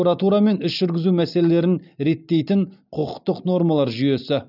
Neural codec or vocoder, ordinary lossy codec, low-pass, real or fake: none; none; 5.4 kHz; real